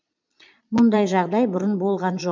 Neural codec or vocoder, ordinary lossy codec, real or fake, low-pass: vocoder, 22.05 kHz, 80 mel bands, Vocos; MP3, 64 kbps; fake; 7.2 kHz